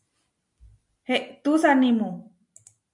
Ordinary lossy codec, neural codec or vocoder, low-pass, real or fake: MP3, 64 kbps; none; 10.8 kHz; real